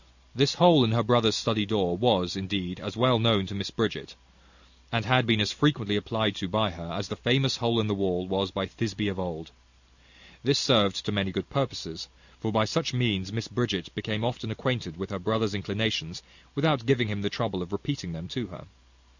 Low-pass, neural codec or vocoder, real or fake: 7.2 kHz; none; real